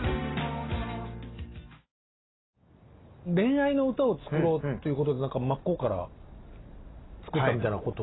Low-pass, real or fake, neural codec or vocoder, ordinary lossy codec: 7.2 kHz; real; none; AAC, 16 kbps